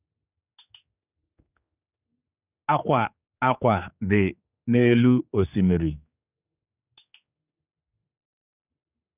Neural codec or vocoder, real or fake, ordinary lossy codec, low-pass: codec, 16 kHz, 4 kbps, X-Codec, HuBERT features, trained on general audio; fake; none; 3.6 kHz